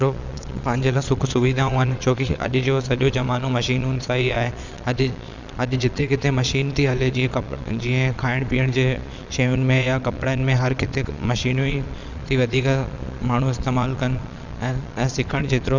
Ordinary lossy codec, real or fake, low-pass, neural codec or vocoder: none; fake; 7.2 kHz; vocoder, 22.05 kHz, 80 mel bands, Vocos